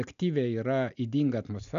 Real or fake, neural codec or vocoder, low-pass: real; none; 7.2 kHz